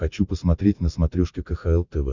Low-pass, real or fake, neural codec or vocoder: 7.2 kHz; real; none